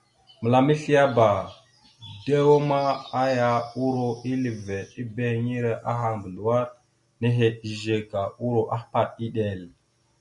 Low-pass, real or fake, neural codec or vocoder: 10.8 kHz; real; none